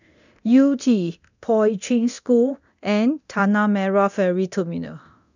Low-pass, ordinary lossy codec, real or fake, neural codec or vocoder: 7.2 kHz; none; fake; codec, 24 kHz, 0.9 kbps, DualCodec